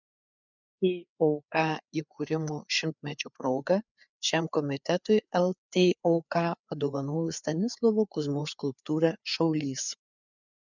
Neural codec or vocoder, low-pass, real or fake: codec, 16 kHz, 4 kbps, FreqCodec, larger model; 7.2 kHz; fake